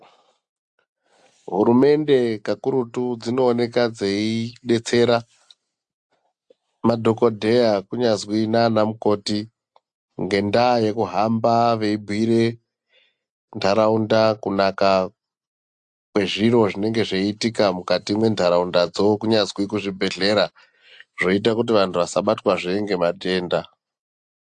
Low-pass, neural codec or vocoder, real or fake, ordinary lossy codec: 10.8 kHz; none; real; AAC, 64 kbps